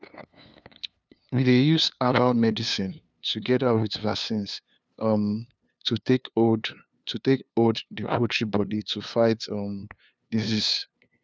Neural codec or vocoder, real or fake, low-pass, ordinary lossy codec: codec, 16 kHz, 2 kbps, FunCodec, trained on LibriTTS, 25 frames a second; fake; none; none